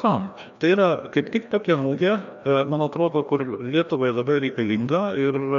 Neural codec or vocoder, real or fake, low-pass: codec, 16 kHz, 1 kbps, FreqCodec, larger model; fake; 7.2 kHz